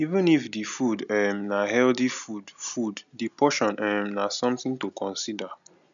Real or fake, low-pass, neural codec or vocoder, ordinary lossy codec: real; 7.2 kHz; none; none